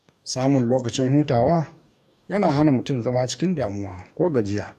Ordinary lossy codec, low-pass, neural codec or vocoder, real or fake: none; 14.4 kHz; codec, 44.1 kHz, 2.6 kbps, DAC; fake